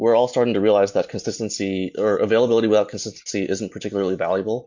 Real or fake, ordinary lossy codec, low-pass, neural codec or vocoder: real; MP3, 48 kbps; 7.2 kHz; none